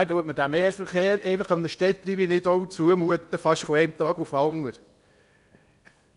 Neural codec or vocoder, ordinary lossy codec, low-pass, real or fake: codec, 16 kHz in and 24 kHz out, 0.8 kbps, FocalCodec, streaming, 65536 codes; none; 10.8 kHz; fake